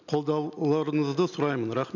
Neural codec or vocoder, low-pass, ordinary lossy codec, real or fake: none; 7.2 kHz; none; real